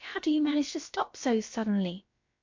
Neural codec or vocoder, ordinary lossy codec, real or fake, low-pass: codec, 16 kHz, about 1 kbps, DyCAST, with the encoder's durations; MP3, 48 kbps; fake; 7.2 kHz